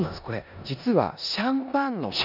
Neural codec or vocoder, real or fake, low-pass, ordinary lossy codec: codec, 16 kHz in and 24 kHz out, 0.9 kbps, LongCat-Audio-Codec, four codebook decoder; fake; 5.4 kHz; none